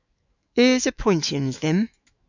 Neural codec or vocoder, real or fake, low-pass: autoencoder, 48 kHz, 128 numbers a frame, DAC-VAE, trained on Japanese speech; fake; 7.2 kHz